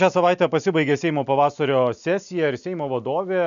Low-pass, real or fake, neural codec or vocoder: 7.2 kHz; real; none